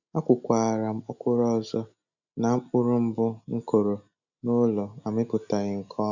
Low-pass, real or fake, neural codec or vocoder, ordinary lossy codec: 7.2 kHz; real; none; none